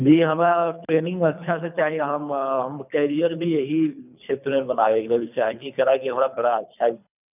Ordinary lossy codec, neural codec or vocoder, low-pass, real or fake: none; codec, 24 kHz, 3 kbps, HILCodec; 3.6 kHz; fake